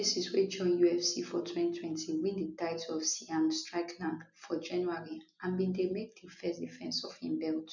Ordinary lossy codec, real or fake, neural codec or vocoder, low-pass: none; real; none; 7.2 kHz